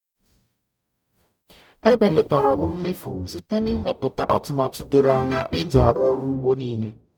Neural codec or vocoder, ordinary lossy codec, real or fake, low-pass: codec, 44.1 kHz, 0.9 kbps, DAC; none; fake; 19.8 kHz